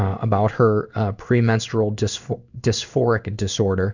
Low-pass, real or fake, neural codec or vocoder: 7.2 kHz; fake; codec, 16 kHz in and 24 kHz out, 1 kbps, XY-Tokenizer